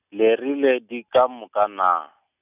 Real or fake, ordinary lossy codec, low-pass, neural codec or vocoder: real; none; 3.6 kHz; none